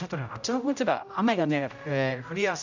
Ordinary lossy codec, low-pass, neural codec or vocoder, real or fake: none; 7.2 kHz; codec, 16 kHz, 0.5 kbps, X-Codec, HuBERT features, trained on general audio; fake